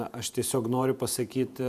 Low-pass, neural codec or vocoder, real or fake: 14.4 kHz; vocoder, 48 kHz, 128 mel bands, Vocos; fake